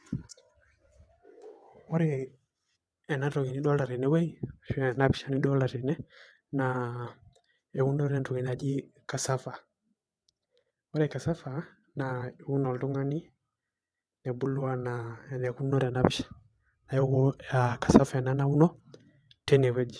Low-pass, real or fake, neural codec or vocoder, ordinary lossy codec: none; fake; vocoder, 22.05 kHz, 80 mel bands, WaveNeXt; none